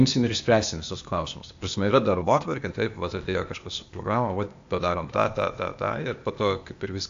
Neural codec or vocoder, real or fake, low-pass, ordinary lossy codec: codec, 16 kHz, 0.8 kbps, ZipCodec; fake; 7.2 kHz; AAC, 64 kbps